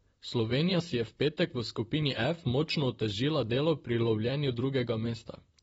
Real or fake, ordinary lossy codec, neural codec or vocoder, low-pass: fake; AAC, 24 kbps; vocoder, 44.1 kHz, 128 mel bands, Pupu-Vocoder; 19.8 kHz